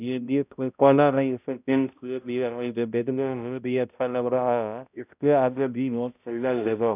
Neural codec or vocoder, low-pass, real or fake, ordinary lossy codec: codec, 16 kHz, 0.5 kbps, X-Codec, HuBERT features, trained on balanced general audio; 3.6 kHz; fake; none